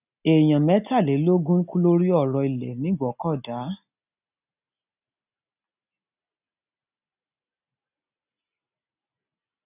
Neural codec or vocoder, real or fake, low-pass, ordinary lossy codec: none; real; 3.6 kHz; none